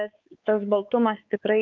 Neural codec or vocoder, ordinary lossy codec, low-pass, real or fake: codec, 16 kHz, 4 kbps, X-Codec, WavLM features, trained on Multilingual LibriSpeech; Opus, 32 kbps; 7.2 kHz; fake